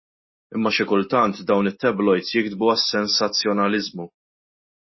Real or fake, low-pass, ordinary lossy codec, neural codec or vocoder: real; 7.2 kHz; MP3, 24 kbps; none